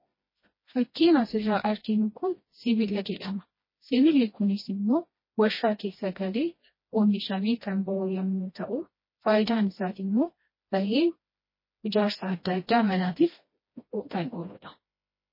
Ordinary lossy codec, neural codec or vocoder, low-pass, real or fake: MP3, 24 kbps; codec, 16 kHz, 1 kbps, FreqCodec, smaller model; 5.4 kHz; fake